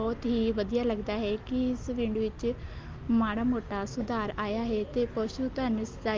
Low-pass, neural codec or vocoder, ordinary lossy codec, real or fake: 7.2 kHz; none; Opus, 32 kbps; real